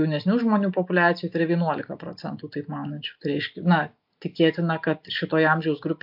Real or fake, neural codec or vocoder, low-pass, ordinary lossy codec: real; none; 5.4 kHz; AAC, 48 kbps